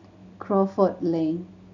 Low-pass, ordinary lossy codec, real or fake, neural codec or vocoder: 7.2 kHz; none; real; none